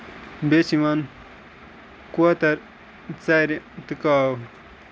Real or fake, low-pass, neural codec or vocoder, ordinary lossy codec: real; none; none; none